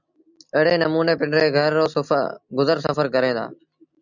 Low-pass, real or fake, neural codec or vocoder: 7.2 kHz; real; none